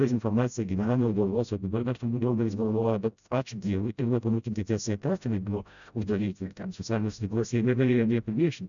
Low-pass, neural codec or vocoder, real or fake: 7.2 kHz; codec, 16 kHz, 0.5 kbps, FreqCodec, smaller model; fake